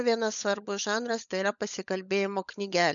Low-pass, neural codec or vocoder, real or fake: 7.2 kHz; codec, 16 kHz, 16 kbps, FunCodec, trained on LibriTTS, 50 frames a second; fake